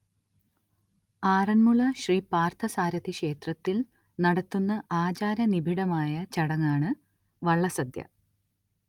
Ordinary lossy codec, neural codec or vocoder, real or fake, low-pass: Opus, 32 kbps; none; real; 19.8 kHz